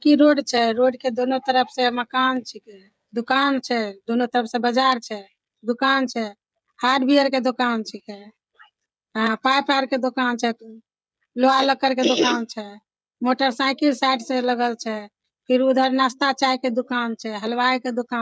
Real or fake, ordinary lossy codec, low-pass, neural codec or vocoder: fake; none; none; codec, 16 kHz, 16 kbps, FreqCodec, smaller model